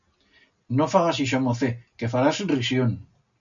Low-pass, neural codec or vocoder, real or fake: 7.2 kHz; none; real